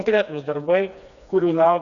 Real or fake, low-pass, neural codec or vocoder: fake; 7.2 kHz; codec, 16 kHz, 2 kbps, FreqCodec, smaller model